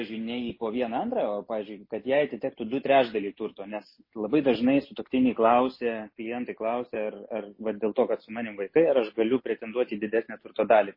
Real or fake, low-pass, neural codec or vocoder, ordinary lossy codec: real; 5.4 kHz; none; MP3, 24 kbps